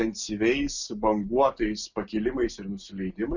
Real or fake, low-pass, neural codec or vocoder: real; 7.2 kHz; none